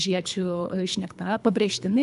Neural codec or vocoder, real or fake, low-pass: codec, 24 kHz, 3 kbps, HILCodec; fake; 10.8 kHz